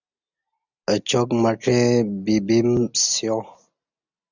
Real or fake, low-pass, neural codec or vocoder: real; 7.2 kHz; none